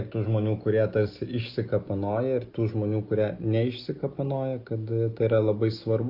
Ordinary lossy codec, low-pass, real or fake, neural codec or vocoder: Opus, 24 kbps; 5.4 kHz; real; none